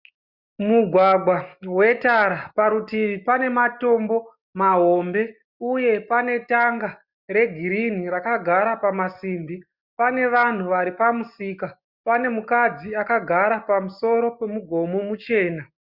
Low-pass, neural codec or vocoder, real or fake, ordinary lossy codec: 5.4 kHz; none; real; Opus, 64 kbps